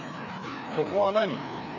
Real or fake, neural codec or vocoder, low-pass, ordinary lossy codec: fake; codec, 16 kHz, 2 kbps, FreqCodec, larger model; 7.2 kHz; none